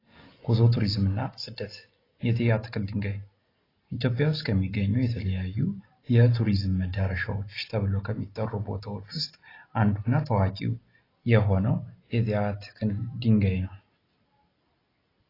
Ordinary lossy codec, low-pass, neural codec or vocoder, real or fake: AAC, 24 kbps; 5.4 kHz; none; real